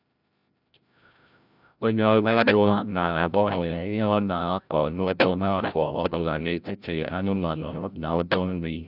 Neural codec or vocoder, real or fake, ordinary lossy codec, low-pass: codec, 16 kHz, 0.5 kbps, FreqCodec, larger model; fake; none; 5.4 kHz